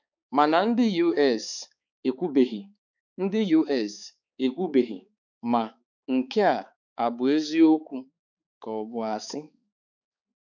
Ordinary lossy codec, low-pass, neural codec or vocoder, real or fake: none; 7.2 kHz; codec, 16 kHz, 4 kbps, X-Codec, HuBERT features, trained on balanced general audio; fake